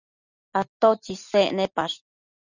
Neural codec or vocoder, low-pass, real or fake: none; 7.2 kHz; real